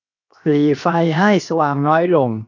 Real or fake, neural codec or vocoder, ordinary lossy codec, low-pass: fake; codec, 16 kHz, 0.7 kbps, FocalCodec; AAC, 48 kbps; 7.2 kHz